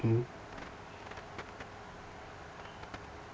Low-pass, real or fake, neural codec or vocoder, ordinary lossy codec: none; real; none; none